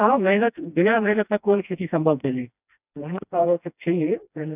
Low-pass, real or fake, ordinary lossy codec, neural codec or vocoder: 3.6 kHz; fake; none; codec, 16 kHz, 1 kbps, FreqCodec, smaller model